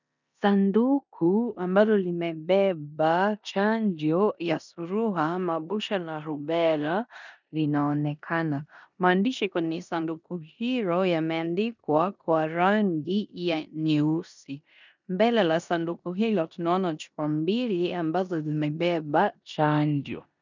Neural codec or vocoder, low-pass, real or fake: codec, 16 kHz in and 24 kHz out, 0.9 kbps, LongCat-Audio-Codec, four codebook decoder; 7.2 kHz; fake